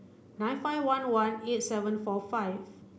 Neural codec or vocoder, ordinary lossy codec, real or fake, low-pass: none; none; real; none